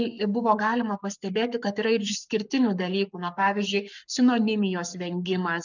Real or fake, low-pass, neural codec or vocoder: fake; 7.2 kHz; codec, 44.1 kHz, 7.8 kbps, Pupu-Codec